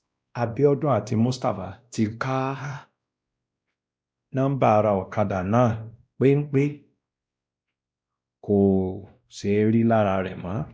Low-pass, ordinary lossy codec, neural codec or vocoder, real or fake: none; none; codec, 16 kHz, 1 kbps, X-Codec, WavLM features, trained on Multilingual LibriSpeech; fake